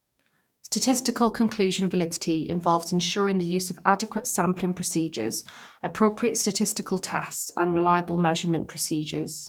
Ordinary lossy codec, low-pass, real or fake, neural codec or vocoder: none; 19.8 kHz; fake; codec, 44.1 kHz, 2.6 kbps, DAC